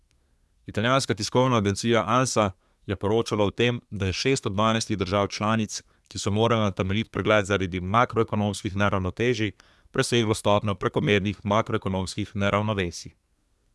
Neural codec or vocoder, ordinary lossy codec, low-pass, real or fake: codec, 24 kHz, 1 kbps, SNAC; none; none; fake